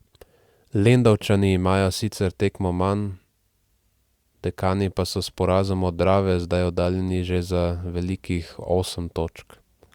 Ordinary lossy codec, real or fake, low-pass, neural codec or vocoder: Opus, 64 kbps; real; 19.8 kHz; none